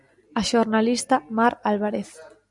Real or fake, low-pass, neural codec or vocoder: real; 10.8 kHz; none